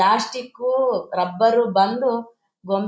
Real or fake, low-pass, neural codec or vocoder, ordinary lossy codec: real; none; none; none